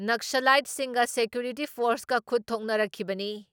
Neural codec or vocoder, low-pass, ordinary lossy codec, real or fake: none; none; none; real